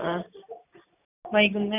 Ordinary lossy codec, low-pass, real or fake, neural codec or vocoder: none; 3.6 kHz; real; none